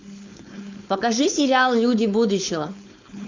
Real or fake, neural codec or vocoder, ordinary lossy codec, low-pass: fake; codec, 16 kHz, 4.8 kbps, FACodec; MP3, 64 kbps; 7.2 kHz